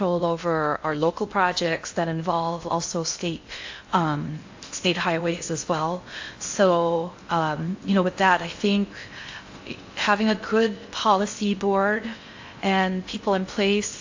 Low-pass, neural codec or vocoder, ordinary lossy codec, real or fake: 7.2 kHz; codec, 16 kHz in and 24 kHz out, 0.6 kbps, FocalCodec, streaming, 2048 codes; AAC, 48 kbps; fake